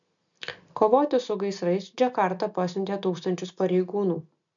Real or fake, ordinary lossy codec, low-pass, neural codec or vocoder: real; MP3, 96 kbps; 7.2 kHz; none